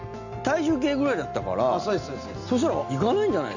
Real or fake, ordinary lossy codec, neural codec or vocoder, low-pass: real; none; none; 7.2 kHz